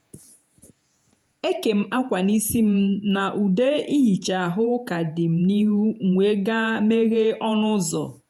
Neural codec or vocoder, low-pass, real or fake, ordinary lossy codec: vocoder, 48 kHz, 128 mel bands, Vocos; 19.8 kHz; fake; none